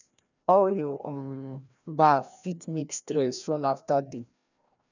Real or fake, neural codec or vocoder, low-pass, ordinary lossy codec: fake; codec, 16 kHz, 1 kbps, FreqCodec, larger model; 7.2 kHz; none